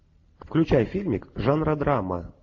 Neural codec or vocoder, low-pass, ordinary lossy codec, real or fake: none; 7.2 kHz; AAC, 48 kbps; real